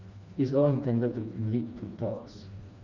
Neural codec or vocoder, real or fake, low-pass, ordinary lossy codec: codec, 16 kHz, 2 kbps, FreqCodec, smaller model; fake; 7.2 kHz; Opus, 64 kbps